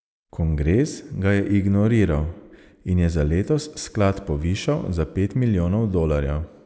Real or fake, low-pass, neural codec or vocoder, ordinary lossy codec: real; none; none; none